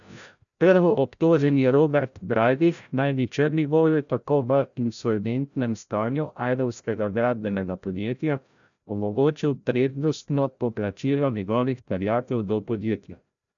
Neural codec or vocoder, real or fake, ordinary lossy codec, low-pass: codec, 16 kHz, 0.5 kbps, FreqCodec, larger model; fake; none; 7.2 kHz